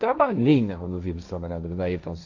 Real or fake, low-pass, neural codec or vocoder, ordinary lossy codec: fake; 7.2 kHz; codec, 16 kHz, 1.1 kbps, Voila-Tokenizer; none